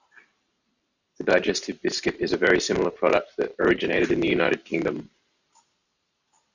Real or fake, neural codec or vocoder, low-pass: fake; vocoder, 44.1 kHz, 128 mel bands every 512 samples, BigVGAN v2; 7.2 kHz